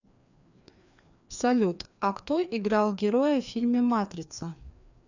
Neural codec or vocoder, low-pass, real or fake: codec, 16 kHz, 2 kbps, FreqCodec, larger model; 7.2 kHz; fake